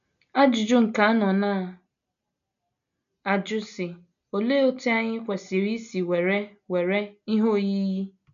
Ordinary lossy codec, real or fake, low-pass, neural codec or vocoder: none; real; 7.2 kHz; none